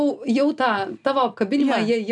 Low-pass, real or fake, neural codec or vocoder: 10.8 kHz; real; none